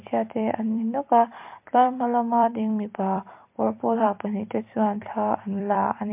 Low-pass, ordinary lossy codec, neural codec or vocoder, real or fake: 3.6 kHz; MP3, 32 kbps; vocoder, 44.1 kHz, 128 mel bands every 256 samples, BigVGAN v2; fake